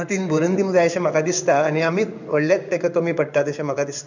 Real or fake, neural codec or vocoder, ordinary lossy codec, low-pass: fake; codec, 16 kHz in and 24 kHz out, 1 kbps, XY-Tokenizer; none; 7.2 kHz